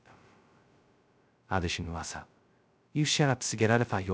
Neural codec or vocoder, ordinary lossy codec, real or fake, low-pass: codec, 16 kHz, 0.2 kbps, FocalCodec; none; fake; none